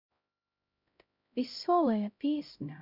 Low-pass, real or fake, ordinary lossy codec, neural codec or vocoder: 5.4 kHz; fake; none; codec, 16 kHz, 0.5 kbps, X-Codec, HuBERT features, trained on LibriSpeech